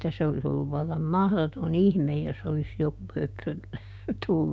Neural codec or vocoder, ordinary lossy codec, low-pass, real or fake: codec, 16 kHz, 6 kbps, DAC; none; none; fake